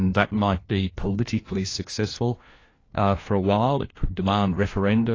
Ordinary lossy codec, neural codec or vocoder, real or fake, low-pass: AAC, 32 kbps; codec, 16 kHz, 1 kbps, FunCodec, trained on LibriTTS, 50 frames a second; fake; 7.2 kHz